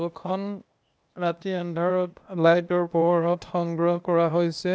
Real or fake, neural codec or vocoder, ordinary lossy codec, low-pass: fake; codec, 16 kHz, 0.8 kbps, ZipCodec; none; none